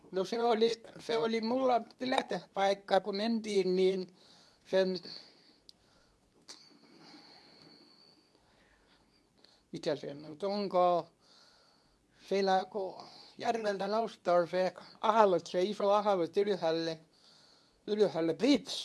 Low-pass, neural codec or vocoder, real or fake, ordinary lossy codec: none; codec, 24 kHz, 0.9 kbps, WavTokenizer, medium speech release version 2; fake; none